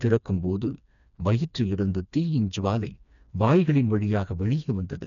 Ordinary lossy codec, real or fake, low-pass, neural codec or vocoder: none; fake; 7.2 kHz; codec, 16 kHz, 2 kbps, FreqCodec, smaller model